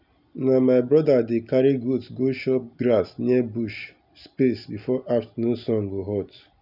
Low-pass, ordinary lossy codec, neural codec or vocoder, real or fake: 5.4 kHz; none; none; real